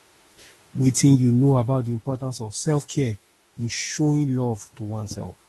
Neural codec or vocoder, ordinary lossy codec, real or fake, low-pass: autoencoder, 48 kHz, 32 numbers a frame, DAC-VAE, trained on Japanese speech; AAC, 32 kbps; fake; 19.8 kHz